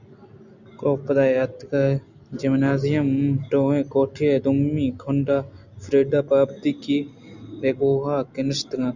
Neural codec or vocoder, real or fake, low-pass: none; real; 7.2 kHz